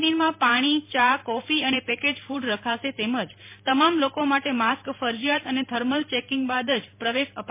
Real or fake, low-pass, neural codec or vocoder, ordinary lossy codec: fake; 3.6 kHz; vocoder, 44.1 kHz, 128 mel bands every 512 samples, BigVGAN v2; MP3, 24 kbps